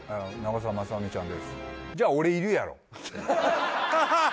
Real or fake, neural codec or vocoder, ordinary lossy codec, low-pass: real; none; none; none